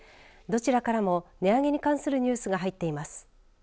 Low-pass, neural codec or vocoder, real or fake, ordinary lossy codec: none; none; real; none